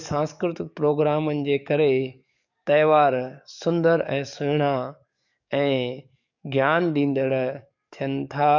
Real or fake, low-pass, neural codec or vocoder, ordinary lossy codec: fake; 7.2 kHz; codec, 44.1 kHz, 7.8 kbps, DAC; none